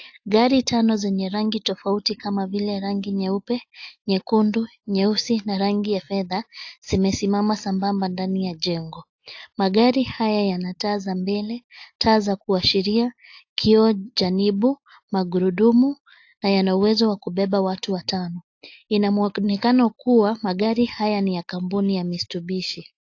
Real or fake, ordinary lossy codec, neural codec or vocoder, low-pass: real; AAC, 48 kbps; none; 7.2 kHz